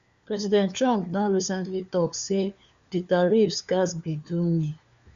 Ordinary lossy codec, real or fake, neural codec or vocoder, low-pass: Opus, 64 kbps; fake; codec, 16 kHz, 4 kbps, FunCodec, trained on LibriTTS, 50 frames a second; 7.2 kHz